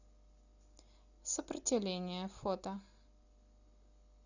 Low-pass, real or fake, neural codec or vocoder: 7.2 kHz; real; none